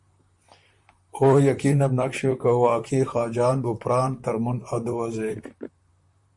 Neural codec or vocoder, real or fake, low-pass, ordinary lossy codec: vocoder, 44.1 kHz, 128 mel bands, Pupu-Vocoder; fake; 10.8 kHz; MP3, 64 kbps